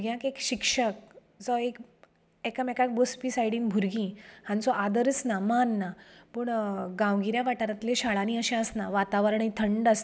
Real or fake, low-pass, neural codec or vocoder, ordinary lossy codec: real; none; none; none